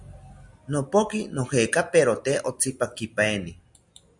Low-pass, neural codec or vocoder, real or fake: 10.8 kHz; none; real